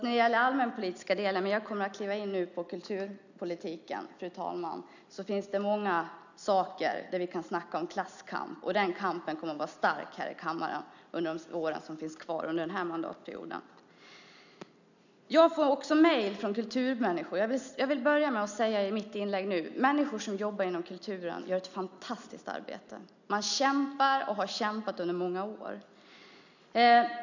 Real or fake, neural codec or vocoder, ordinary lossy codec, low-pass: real; none; none; 7.2 kHz